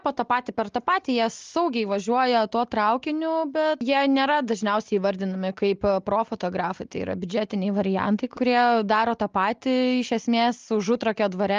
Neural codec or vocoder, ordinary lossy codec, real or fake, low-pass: none; Opus, 32 kbps; real; 7.2 kHz